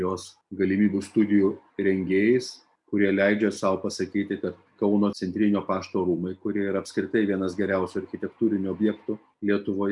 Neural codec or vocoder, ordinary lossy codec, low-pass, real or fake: none; MP3, 96 kbps; 10.8 kHz; real